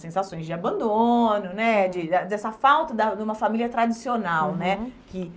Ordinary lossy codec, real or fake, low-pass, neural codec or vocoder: none; real; none; none